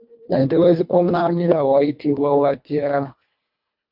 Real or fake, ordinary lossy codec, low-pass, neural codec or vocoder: fake; MP3, 48 kbps; 5.4 kHz; codec, 24 kHz, 1.5 kbps, HILCodec